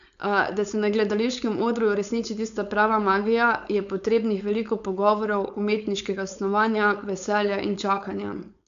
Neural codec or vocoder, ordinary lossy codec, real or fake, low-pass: codec, 16 kHz, 4.8 kbps, FACodec; none; fake; 7.2 kHz